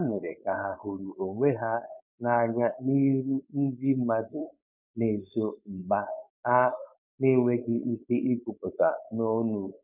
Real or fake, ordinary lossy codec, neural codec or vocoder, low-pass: fake; none; codec, 16 kHz, 4.8 kbps, FACodec; 3.6 kHz